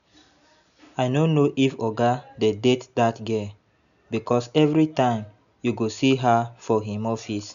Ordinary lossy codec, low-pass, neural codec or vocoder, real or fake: none; 7.2 kHz; none; real